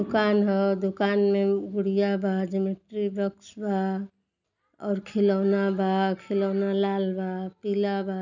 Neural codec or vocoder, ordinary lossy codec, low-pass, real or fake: none; none; 7.2 kHz; real